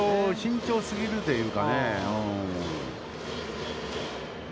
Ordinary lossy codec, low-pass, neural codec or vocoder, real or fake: none; none; none; real